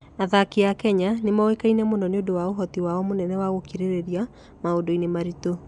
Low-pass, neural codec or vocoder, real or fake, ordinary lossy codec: 10.8 kHz; none; real; none